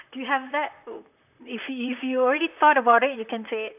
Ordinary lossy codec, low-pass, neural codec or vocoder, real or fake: none; 3.6 kHz; vocoder, 44.1 kHz, 128 mel bands, Pupu-Vocoder; fake